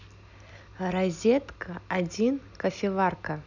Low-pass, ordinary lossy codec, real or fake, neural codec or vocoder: 7.2 kHz; none; real; none